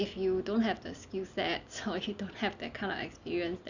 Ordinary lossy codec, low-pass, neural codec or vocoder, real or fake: none; 7.2 kHz; none; real